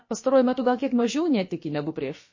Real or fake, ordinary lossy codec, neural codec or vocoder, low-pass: fake; MP3, 32 kbps; codec, 16 kHz, about 1 kbps, DyCAST, with the encoder's durations; 7.2 kHz